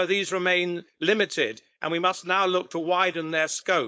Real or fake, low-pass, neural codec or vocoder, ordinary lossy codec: fake; none; codec, 16 kHz, 4.8 kbps, FACodec; none